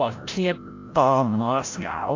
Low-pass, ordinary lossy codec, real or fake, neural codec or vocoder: 7.2 kHz; AAC, 48 kbps; fake; codec, 16 kHz, 0.5 kbps, FreqCodec, larger model